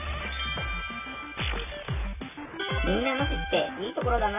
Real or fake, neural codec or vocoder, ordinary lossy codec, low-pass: real; none; none; 3.6 kHz